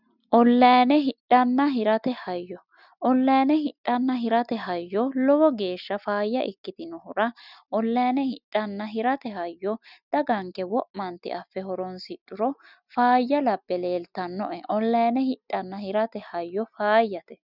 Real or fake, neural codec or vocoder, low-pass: real; none; 5.4 kHz